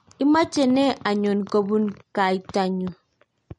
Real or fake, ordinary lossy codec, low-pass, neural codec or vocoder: real; MP3, 48 kbps; 19.8 kHz; none